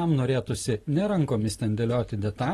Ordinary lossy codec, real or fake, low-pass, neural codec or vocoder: AAC, 32 kbps; real; 19.8 kHz; none